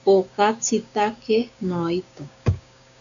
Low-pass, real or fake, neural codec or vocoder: 7.2 kHz; fake; codec, 16 kHz, 6 kbps, DAC